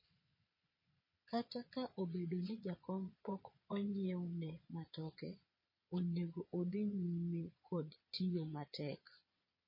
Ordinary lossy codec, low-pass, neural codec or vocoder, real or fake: MP3, 24 kbps; 5.4 kHz; vocoder, 22.05 kHz, 80 mel bands, Vocos; fake